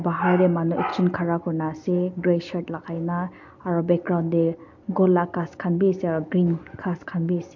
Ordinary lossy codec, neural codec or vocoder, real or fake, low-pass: MP3, 48 kbps; none; real; 7.2 kHz